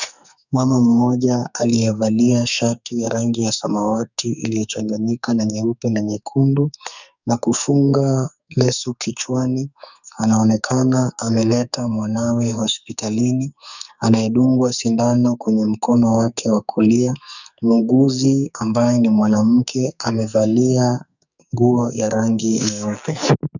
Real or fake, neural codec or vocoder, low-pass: fake; codec, 44.1 kHz, 2.6 kbps, SNAC; 7.2 kHz